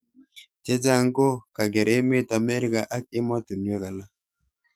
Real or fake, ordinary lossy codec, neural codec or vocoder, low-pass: fake; none; codec, 44.1 kHz, 7.8 kbps, DAC; none